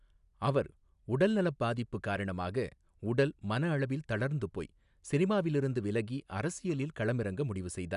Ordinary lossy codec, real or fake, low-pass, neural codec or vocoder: none; real; 10.8 kHz; none